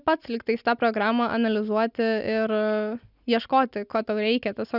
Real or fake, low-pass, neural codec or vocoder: real; 5.4 kHz; none